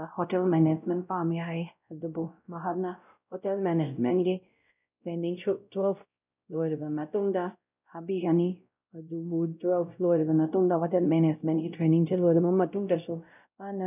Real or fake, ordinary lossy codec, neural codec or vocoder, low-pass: fake; none; codec, 16 kHz, 0.5 kbps, X-Codec, WavLM features, trained on Multilingual LibriSpeech; 3.6 kHz